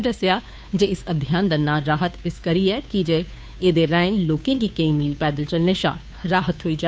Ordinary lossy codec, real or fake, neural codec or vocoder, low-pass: none; fake; codec, 16 kHz, 2 kbps, FunCodec, trained on Chinese and English, 25 frames a second; none